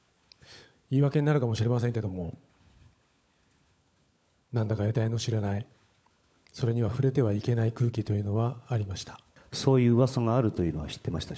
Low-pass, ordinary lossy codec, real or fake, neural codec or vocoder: none; none; fake; codec, 16 kHz, 16 kbps, FunCodec, trained on LibriTTS, 50 frames a second